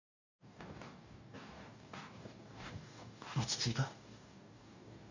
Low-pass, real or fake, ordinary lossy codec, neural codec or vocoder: 7.2 kHz; fake; none; codec, 44.1 kHz, 2.6 kbps, DAC